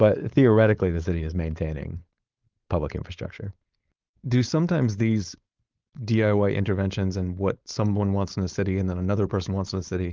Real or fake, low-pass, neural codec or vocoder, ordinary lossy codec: fake; 7.2 kHz; codec, 16 kHz, 4.8 kbps, FACodec; Opus, 32 kbps